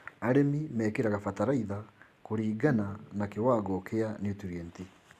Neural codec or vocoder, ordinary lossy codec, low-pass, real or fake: none; Opus, 64 kbps; 14.4 kHz; real